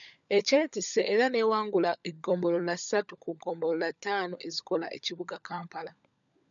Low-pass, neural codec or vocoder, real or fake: 7.2 kHz; codec, 16 kHz, 16 kbps, FunCodec, trained on LibriTTS, 50 frames a second; fake